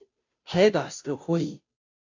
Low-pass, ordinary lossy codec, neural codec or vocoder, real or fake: 7.2 kHz; AAC, 48 kbps; codec, 16 kHz, 0.5 kbps, FunCodec, trained on Chinese and English, 25 frames a second; fake